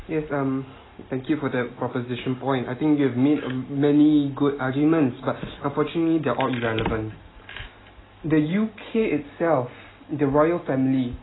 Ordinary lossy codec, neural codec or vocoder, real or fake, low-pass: AAC, 16 kbps; none; real; 7.2 kHz